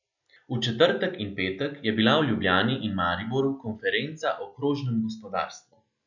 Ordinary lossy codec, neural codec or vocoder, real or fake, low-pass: none; none; real; 7.2 kHz